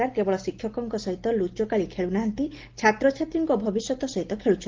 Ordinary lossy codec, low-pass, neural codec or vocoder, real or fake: Opus, 16 kbps; 7.2 kHz; none; real